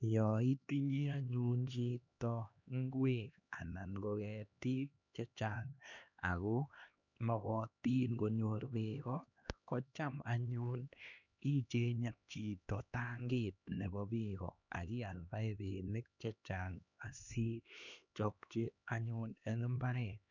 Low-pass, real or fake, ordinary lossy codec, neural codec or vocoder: 7.2 kHz; fake; none; codec, 16 kHz, 2 kbps, X-Codec, HuBERT features, trained on LibriSpeech